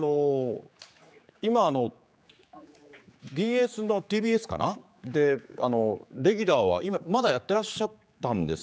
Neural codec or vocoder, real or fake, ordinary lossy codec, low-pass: codec, 16 kHz, 4 kbps, X-Codec, HuBERT features, trained on general audio; fake; none; none